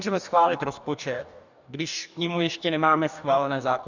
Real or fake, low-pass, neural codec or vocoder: fake; 7.2 kHz; codec, 44.1 kHz, 2.6 kbps, DAC